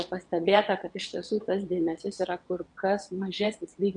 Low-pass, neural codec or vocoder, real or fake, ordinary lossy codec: 9.9 kHz; vocoder, 22.05 kHz, 80 mel bands, WaveNeXt; fake; MP3, 64 kbps